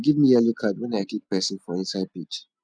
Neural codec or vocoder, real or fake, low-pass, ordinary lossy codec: none; real; 9.9 kHz; none